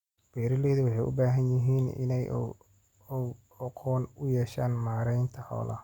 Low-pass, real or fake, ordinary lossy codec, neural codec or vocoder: 19.8 kHz; real; Opus, 64 kbps; none